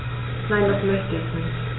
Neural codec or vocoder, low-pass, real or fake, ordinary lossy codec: none; 7.2 kHz; real; AAC, 16 kbps